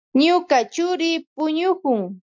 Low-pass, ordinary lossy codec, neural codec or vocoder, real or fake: 7.2 kHz; MP3, 64 kbps; none; real